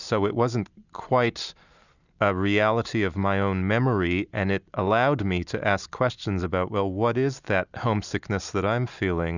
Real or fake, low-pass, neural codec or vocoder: real; 7.2 kHz; none